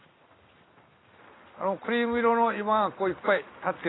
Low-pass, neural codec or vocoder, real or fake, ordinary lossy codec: 7.2 kHz; none; real; AAC, 16 kbps